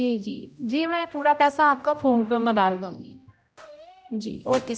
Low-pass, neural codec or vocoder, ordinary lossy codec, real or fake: none; codec, 16 kHz, 0.5 kbps, X-Codec, HuBERT features, trained on general audio; none; fake